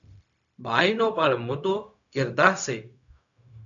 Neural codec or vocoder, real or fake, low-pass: codec, 16 kHz, 0.4 kbps, LongCat-Audio-Codec; fake; 7.2 kHz